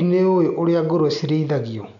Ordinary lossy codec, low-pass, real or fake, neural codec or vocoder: none; 7.2 kHz; real; none